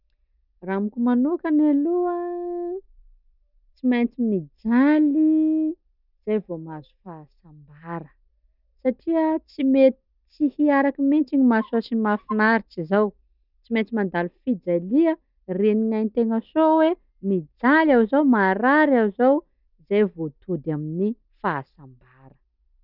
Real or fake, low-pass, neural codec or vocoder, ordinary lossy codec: real; 5.4 kHz; none; none